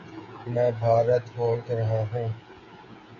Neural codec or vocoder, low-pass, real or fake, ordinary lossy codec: codec, 16 kHz, 16 kbps, FreqCodec, smaller model; 7.2 kHz; fake; AAC, 48 kbps